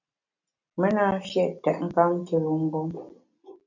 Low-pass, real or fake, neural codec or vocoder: 7.2 kHz; real; none